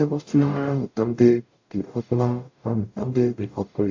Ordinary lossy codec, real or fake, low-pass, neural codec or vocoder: AAC, 48 kbps; fake; 7.2 kHz; codec, 44.1 kHz, 0.9 kbps, DAC